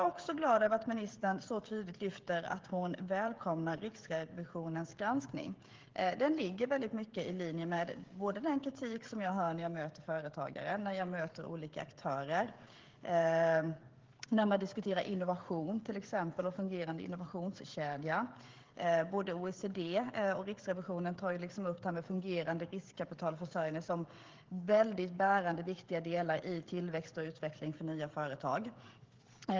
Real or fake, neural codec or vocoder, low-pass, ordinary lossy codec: fake; codec, 16 kHz, 16 kbps, FreqCodec, smaller model; 7.2 kHz; Opus, 16 kbps